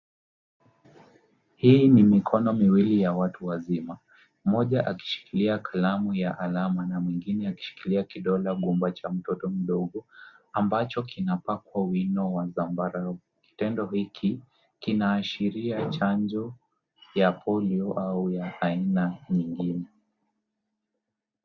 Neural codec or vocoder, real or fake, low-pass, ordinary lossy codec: none; real; 7.2 kHz; Opus, 64 kbps